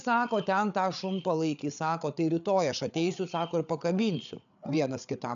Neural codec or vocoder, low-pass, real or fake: codec, 16 kHz, 8 kbps, FreqCodec, larger model; 7.2 kHz; fake